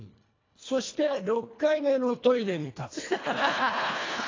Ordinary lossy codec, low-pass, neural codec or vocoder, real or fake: AAC, 32 kbps; 7.2 kHz; codec, 24 kHz, 1.5 kbps, HILCodec; fake